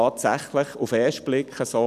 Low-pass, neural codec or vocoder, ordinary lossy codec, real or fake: 14.4 kHz; none; none; real